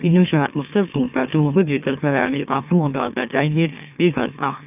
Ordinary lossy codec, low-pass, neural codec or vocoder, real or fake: none; 3.6 kHz; autoencoder, 44.1 kHz, a latent of 192 numbers a frame, MeloTTS; fake